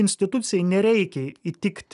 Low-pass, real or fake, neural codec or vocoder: 10.8 kHz; real; none